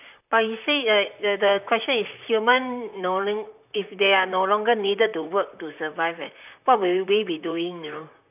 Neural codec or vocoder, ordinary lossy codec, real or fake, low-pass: vocoder, 44.1 kHz, 128 mel bands, Pupu-Vocoder; none; fake; 3.6 kHz